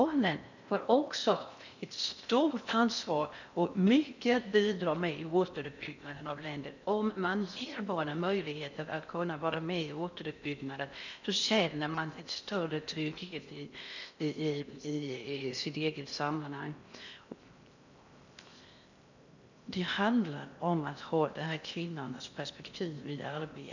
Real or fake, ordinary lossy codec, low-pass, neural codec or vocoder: fake; none; 7.2 kHz; codec, 16 kHz in and 24 kHz out, 0.6 kbps, FocalCodec, streaming, 4096 codes